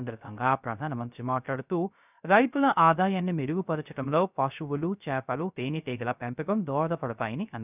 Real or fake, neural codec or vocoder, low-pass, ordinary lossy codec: fake; codec, 16 kHz, 0.3 kbps, FocalCodec; 3.6 kHz; none